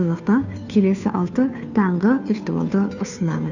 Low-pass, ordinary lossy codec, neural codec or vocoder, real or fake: 7.2 kHz; none; codec, 16 kHz, 2 kbps, FunCodec, trained on Chinese and English, 25 frames a second; fake